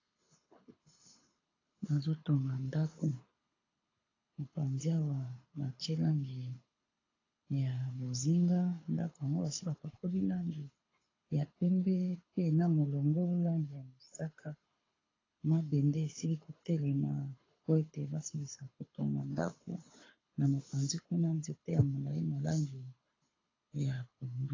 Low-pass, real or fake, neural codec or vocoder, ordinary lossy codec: 7.2 kHz; fake; codec, 24 kHz, 6 kbps, HILCodec; AAC, 32 kbps